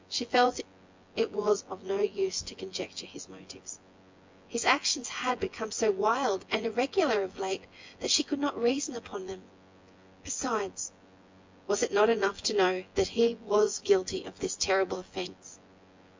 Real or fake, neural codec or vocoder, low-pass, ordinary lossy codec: fake; vocoder, 24 kHz, 100 mel bands, Vocos; 7.2 kHz; MP3, 64 kbps